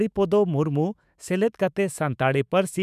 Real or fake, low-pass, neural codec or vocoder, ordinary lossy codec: fake; 14.4 kHz; autoencoder, 48 kHz, 128 numbers a frame, DAC-VAE, trained on Japanese speech; none